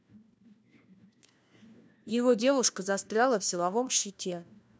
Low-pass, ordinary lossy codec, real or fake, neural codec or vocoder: none; none; fake; codec, 16 kHz, 1 kbps, FunCodec, trained on LibriTTS, 50 frames a second